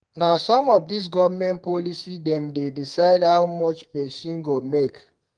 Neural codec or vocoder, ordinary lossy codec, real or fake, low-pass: codec, 32 kHz, 1.9 kbps, SNAC; Opus, 24 kbps; fake; 9.9 kHz